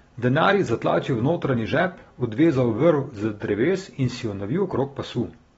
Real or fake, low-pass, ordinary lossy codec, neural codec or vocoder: real; 19.8 kHz; AAC, 24 kbps; none